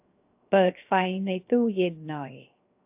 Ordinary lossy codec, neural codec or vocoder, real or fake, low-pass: AAC, 32 kbps; codec, 16 kHz, 0.7 kbps, FocalCodec; fake; 3.6 kHz